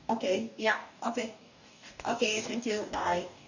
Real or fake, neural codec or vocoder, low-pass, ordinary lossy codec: fake; codec, 44.1 kHz, 2.6 kbps, DAC; 7.2 kHz; none